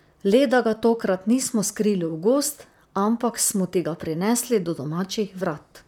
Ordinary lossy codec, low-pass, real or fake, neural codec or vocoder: none; 19.8 kHz; fake; vocoder, 44.1 kHz, 128 mel bands, Pupu-Vocoder